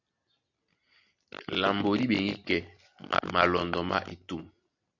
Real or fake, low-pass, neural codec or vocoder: real; 7.2 kHz; none